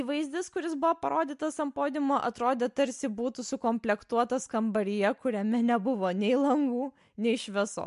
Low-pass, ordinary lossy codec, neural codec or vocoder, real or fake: 10.8 kHz; MP3, 64 kbps; none; real